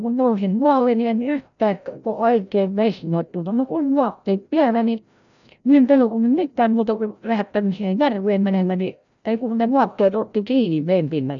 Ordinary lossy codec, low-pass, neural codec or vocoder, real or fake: none; 7.2 kHz; codec, 16 kHz, 0.5 kbps, FreqCodec, larger model; fake